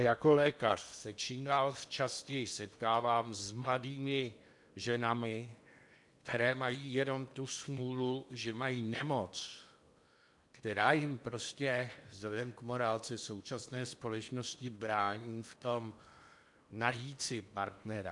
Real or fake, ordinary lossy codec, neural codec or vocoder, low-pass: fake; MP3, 96 kbps; codec, 16 kHz in and 24 kHz out, 0.8 kbps, FocalCodec, streaming, 65536 codes; 10.8 kHz